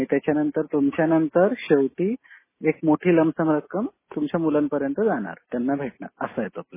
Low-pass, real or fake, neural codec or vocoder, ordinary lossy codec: 3.6 kHz; real; none; MP3, 16 kbps